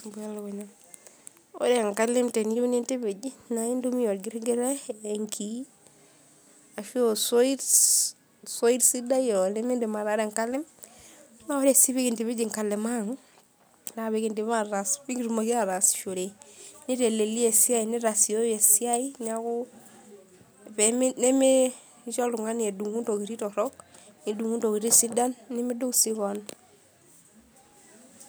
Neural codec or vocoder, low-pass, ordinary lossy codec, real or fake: none; none; none; real